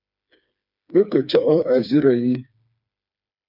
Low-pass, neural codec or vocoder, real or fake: 5.4 kHz; codec, 16 kHz, 4 kbps, FreqCodec, smaller model; fake